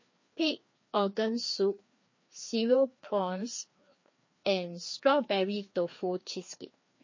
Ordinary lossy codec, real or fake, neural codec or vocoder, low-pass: MP3, 32 kbps; fake; codec, 16 kHz, 2 kbps, FreqCodec, larger model; 7.2 kHz